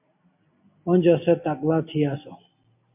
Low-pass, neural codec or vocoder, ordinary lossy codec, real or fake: 3.6 kHz; none; MP3, 32 kbps; real